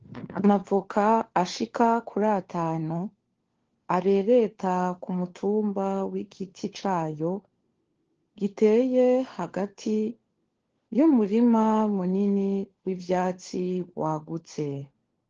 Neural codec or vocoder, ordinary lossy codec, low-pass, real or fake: codec, 16 kHz, 4 kbps, FunCodec, trained on LibriTTS, 50 frames a second; Opus, 16 kbps; 7.2 kHz; fake